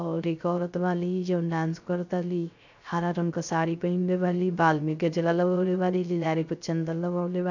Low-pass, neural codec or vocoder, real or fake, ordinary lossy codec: 7.2 kHz; codec, 16 kHz, 0.3 kbps, FocalCodec; fake; none